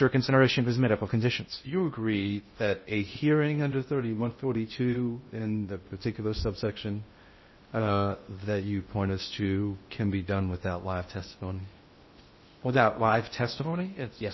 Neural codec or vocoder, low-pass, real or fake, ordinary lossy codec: codec, 16 kHz in and 24 kHz out, 0.6 kbps, FocalCodec, streaming, 2048 codes; 7.2 kHz; fake; MP3, 24 kbps